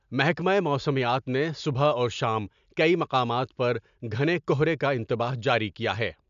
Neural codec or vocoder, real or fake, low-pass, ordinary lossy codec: none; real; 7.2 kHz; MP3, 96 kbps